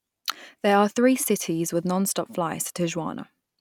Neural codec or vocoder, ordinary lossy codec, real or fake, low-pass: none; none; real; 19.8 kHz